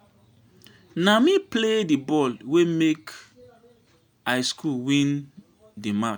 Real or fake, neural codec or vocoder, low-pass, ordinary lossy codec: real; none; none; none